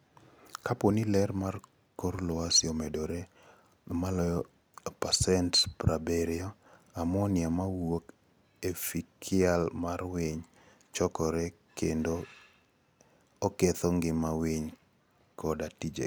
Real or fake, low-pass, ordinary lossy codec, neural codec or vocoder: real; none; none; none